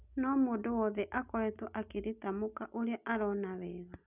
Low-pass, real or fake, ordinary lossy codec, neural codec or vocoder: 3.6 kHz; real; none; none